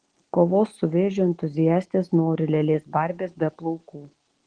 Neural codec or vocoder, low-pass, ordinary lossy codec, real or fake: none; 9.9 kHz; Opus, 16 kbps; real